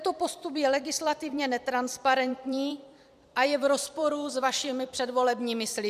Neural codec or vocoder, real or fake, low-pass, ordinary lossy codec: vocoder, 44.1 kHz, 128 mel bands every 256 samples, BigVGAN v2; fake; 14.4 kHz; MP3, 96 kbps